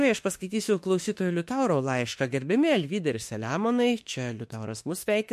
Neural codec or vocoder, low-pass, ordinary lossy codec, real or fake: autoencoder, 48 kHz, 32 numbers a frame, DAC-VAE, trained on Japanese speech; 14.4 kHz; MP3, 64 kbps; fake